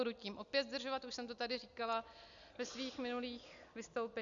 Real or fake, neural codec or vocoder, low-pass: real; none; 7.2 kHz